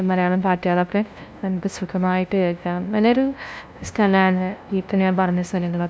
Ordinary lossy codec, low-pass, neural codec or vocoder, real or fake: none; none; codec, 16 kHz, 0.5 kbps, FunCodec, trained on LibriTTS, 25 frames a second; fake